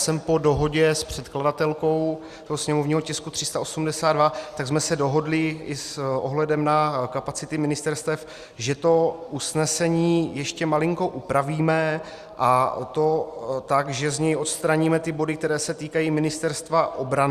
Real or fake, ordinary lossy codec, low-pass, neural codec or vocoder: real; Opus, 64 kbps; 14.4 kHz; none